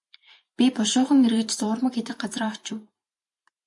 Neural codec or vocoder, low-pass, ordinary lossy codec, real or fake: vocoder, 44.1 kHz, 128 mel bands every 512 samples, BigVGAN v2; 10.8 kHz; MP3, 64 kbps; fake